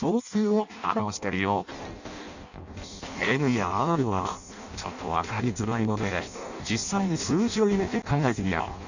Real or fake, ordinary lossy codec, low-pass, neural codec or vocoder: fake; none; 7.2 kHz; codec, 16 kHz in and 24 kHz out, 0.6 kbps, FireRedTTS-2 codec